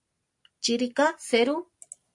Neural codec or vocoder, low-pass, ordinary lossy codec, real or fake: none; 10.8 kHz; AAC, 64 kbps; real